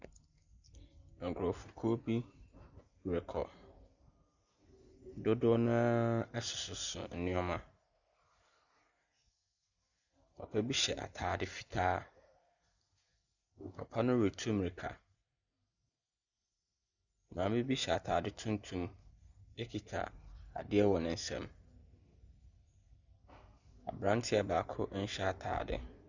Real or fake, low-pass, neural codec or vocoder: real; 7.2 kHz; none